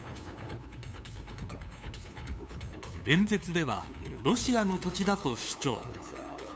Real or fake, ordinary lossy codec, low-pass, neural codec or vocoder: fake; none; none; codec, 16 kHz, 2 kbps, FunCodec, trained on LibriTTS, 25 frames a second